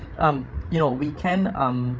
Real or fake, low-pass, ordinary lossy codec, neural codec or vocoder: fake; none; none; codec, 16 kHz, 8 kbps, FreqCodec, larger model